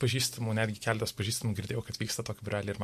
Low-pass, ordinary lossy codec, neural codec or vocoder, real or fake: 14.4 kHz; MP3, 64 kbps; none; real